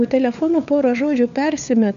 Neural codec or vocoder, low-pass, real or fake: codec, 16 kHz, 4 kbps, FunCodec, trained on LibriTTS, 50 frames a second; 7.2 kHz; fake